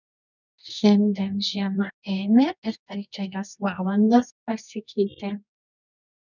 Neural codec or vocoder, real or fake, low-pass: codec, 24 kHz, 0.9 kbps, WavTokenizer, medium music audio release; fake; 7.2 kHz